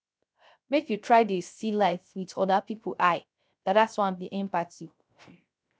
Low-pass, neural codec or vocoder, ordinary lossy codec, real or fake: none; codec, 16 kHz, 0.3 kbps, FocalCodec; none; fake